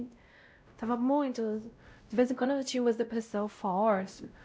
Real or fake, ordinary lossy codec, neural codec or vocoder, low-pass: fake; none; codec, 16 kHz, 0.5 kbps, X-Codec, WavLM features, trained on Multilingual LibriSpeech; none